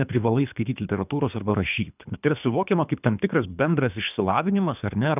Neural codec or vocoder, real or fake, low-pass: codec, 24 kHz, 3 kbps, HILCodec; fake; 3.6 kHz